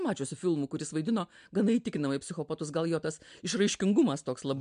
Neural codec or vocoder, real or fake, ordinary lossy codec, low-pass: none; real; MP3, 64 kbps; 9.9 kHz